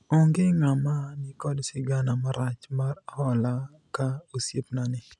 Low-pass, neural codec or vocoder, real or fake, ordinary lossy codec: 10.8 kHz; none; real; none